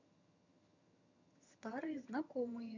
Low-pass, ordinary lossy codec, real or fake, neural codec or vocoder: 7.2 kHz; none; fake; vocoder, 22.05 kHz, 80 mel bands, HiFi-GAN